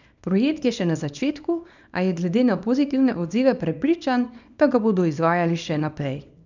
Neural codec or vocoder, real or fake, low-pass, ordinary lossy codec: codec, 24 kHz, 0.9 kbps, WavTokenizer, small release; fake; 7.2 kHz; none